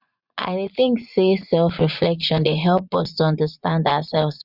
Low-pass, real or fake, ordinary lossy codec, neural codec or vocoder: 5.4 kHz; fake; none; vocoder, 24 kHz, 100 mel bands, Vocos